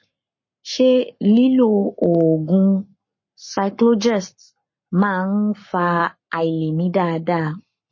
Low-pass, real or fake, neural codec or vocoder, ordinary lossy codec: 7.2 kHz; fake; codec, 44.1 kHz, 7.8 kbps, Pupu-Codec; MP3, 32 kbps